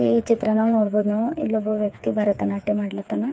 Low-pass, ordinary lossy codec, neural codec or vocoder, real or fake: none; none; codec, 16 kHz, 4 kbps, FreqCodec, smaller model; fake